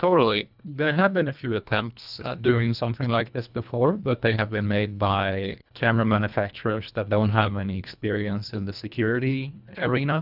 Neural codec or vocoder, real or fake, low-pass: codec, 24 kHz, 1.5 kbps, HILCodec; fake; 5.4 kHz